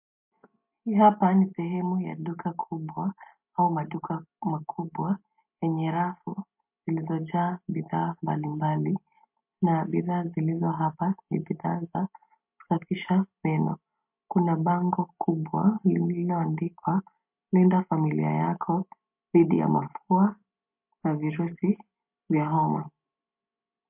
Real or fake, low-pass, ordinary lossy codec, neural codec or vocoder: real; 3.6 kHz; MP3, 32 kbps; none